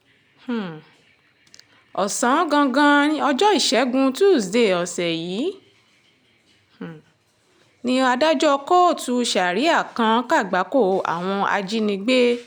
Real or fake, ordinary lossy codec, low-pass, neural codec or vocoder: real; none; none; none